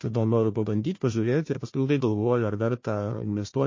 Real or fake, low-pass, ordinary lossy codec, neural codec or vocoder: fake; 7.2 kHz; MP3, 32 kbps; codec, 16 kHz, 1 kbps, FunCodec, trained on Chinese and English, 50 frames a second